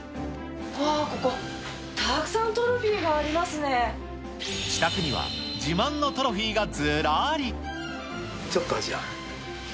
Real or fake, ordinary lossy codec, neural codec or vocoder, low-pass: real; none; none; none